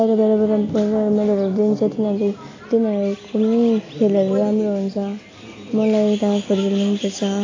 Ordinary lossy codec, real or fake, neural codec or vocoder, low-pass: AAC, 32 kbps; real; none; 7.2 kHz